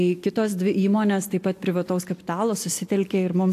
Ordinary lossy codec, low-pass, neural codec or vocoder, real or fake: AAC, 64 kbps; 14.4 kHz; none; real